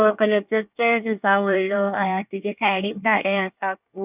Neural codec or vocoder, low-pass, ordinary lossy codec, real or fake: codec, 24 kHz, 1 kbps, SNAC; 3.6 kHz; none; fake